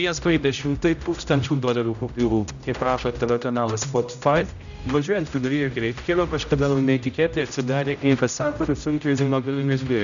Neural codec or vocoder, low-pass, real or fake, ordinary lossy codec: codec, 16 kHz, 0.5 kbps, X-Codec, HuBERT features, trained on general audio; 7.2 kHz; fake; MP3, 96 kbps